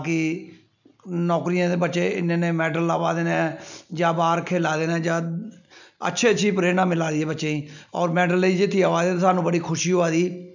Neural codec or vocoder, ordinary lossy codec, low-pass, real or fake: none; none; 7.2 kHz; real